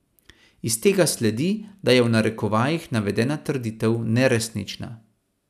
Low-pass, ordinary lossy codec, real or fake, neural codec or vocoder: 14.4 kHz; none; real; none